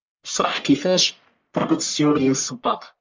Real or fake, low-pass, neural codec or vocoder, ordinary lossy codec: fake; 7.2 kHz; codec, 44.1 kHz, 1.7 kbps, Pupu-Codec; MP3, 64 kbps